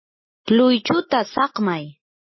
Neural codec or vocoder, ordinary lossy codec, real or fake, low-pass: none; MP3, 24 kbps; real; 7.2 kHz